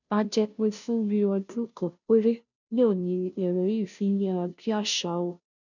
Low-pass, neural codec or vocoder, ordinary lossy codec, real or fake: 7.2 kHz; codec, 16 kHz, 0.5 kbps, FunCodec, trained on Chinese and English, 25 frames a second; none; fake